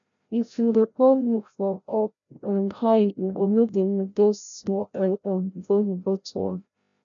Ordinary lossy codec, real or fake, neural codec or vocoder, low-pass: none; fake; codec, 16 kHz, 0.5 kbps, FreqCodec, larger model; 7.2 kHz